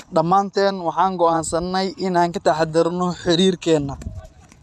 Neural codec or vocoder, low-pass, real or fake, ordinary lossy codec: vocoder, 24 kHz, 100 mel bands, Vocos; none; fake; none